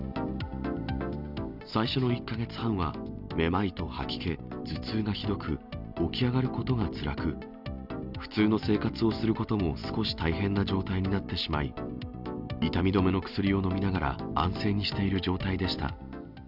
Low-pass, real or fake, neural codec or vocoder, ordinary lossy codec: 5.4 kHz; real; none; none